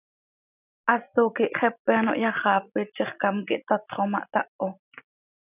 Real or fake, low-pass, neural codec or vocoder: real; 3.6 kHz; none